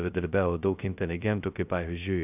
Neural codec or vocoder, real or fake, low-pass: codec, 16 kHz, 0.2 kbps, FocalCodec; fake; 3.6 kHz